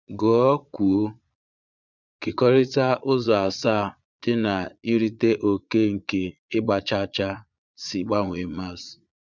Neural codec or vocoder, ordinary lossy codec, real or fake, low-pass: vocoder, 22.05 kHz, 80 mel bands, Vocos; none; fake; 7.2 kHz